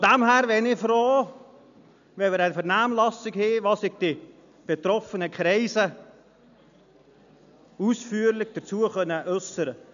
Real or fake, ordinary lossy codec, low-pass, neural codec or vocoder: real; none; 7.2 kHz; none